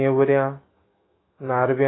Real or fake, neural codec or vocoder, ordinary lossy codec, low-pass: fake; autoencoder, 48 kHz, 128 numbers a frame, DAC-VAE, trained on Japanese speech; AAC, 16 kbps; 7.2 kHz